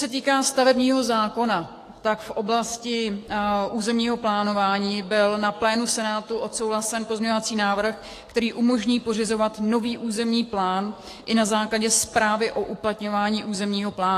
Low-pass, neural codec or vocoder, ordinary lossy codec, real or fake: 14.4 kHz; codec, 44.1 kHz, 7.8 kbps, DAC; AAC, 48 kbps; fake